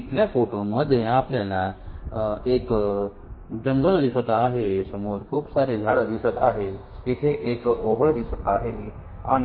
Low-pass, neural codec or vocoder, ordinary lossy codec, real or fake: 5.4 kHz; codec, 32 kHz, 1.9 kbps, SNAC; MP3, 24 kbps; fake